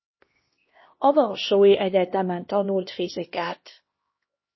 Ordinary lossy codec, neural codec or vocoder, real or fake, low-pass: MP3, 24 kbps; codec, 16 kHz, 1 kbps, X-Codec, HuBERT features, trained on LibriSpeech; fake; 7.2 kHz